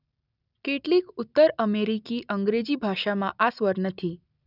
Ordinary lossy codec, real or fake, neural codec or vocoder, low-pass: none; real; none; 5.4 kHz